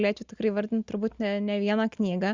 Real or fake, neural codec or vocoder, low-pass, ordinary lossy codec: real; none; 7.2 kHz; Opus, 64 kbps